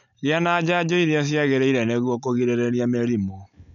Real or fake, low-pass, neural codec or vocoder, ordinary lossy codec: real; 7.2 kHz; none; none